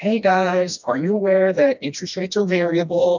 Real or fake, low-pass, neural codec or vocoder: fake; 7.2 kHz; codec, 16 kHz, 1 kbps, FreqCodec, smaller model